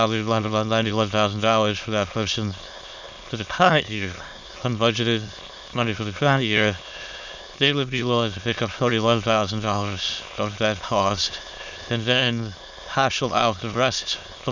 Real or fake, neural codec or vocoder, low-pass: fake; autoencoder, 22.05 kHz, a latent of 192 numbers a frame, VITS, trained on many speakers; 7.2 kHz